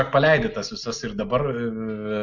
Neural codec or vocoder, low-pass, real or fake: none; 7.2 kHz; real